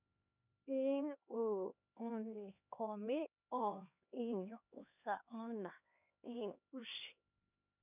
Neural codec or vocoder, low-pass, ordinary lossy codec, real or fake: codec, 16 kHz, 2 kbps, X-Codec, HuBERT features, trained on LibriSpeech; 3.6 kHz; none; fake